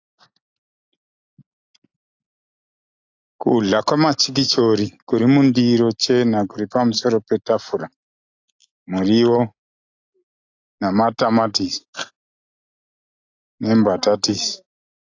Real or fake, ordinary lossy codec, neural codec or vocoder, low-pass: real; AAC, 48 kbps; none; 7.2 kHz